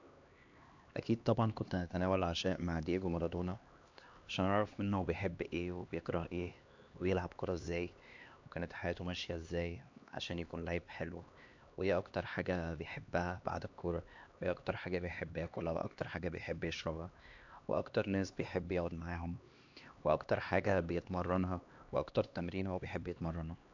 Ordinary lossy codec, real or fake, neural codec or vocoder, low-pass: AAC, 48 kbps; fake; codec, 16 kHz, 2 kbps, X-Codec, HuBERT features, trained on LibriSpeech; 7.2 kHz